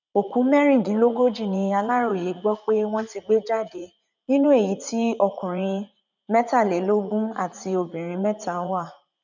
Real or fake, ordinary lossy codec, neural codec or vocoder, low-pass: fake; none; vocoder, 44.1 kHz, 80 mel bands, Vocos; 7.2 kHz